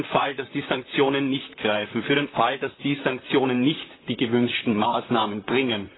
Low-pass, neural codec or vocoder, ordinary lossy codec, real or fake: 7.2 kHz; vocoder, 44.1 kHz, 128 mel bands, Pupu-Vocoder; AAC, 16 kbps; fake